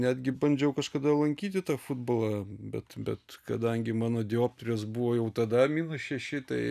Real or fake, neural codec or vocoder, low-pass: real; none; 14.4 kHz